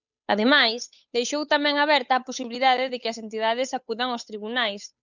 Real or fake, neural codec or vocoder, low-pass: fake; codec, 16 kHz, 8 kbps, FunCodec, trained on Chinese and English, 25 frames a second; 7.2 kHz